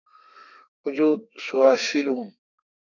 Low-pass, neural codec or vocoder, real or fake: 7.2 kHz; codec, 32 kHz, 1.9 kbps, SNAC; fake